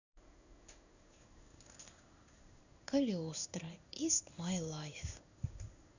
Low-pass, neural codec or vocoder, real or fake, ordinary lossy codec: 7.2 kHz; codec, 16 kHz in and 24 kHz out, 1 kbps, XY-Tokenizer; fake; none